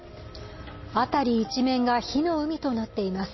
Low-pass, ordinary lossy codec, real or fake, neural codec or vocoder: 7.2 kHz; MP3, 24 kbps; real; none